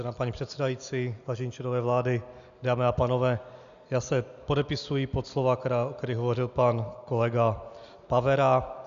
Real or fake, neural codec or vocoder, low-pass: real; none; 7.2 kHz